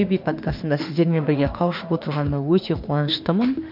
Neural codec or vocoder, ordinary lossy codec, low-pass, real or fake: autoencoder, 48 kHz, 32 numbers a frame, DAC-VAE, trained on Japanese speech; none; 5.4 kHz; fake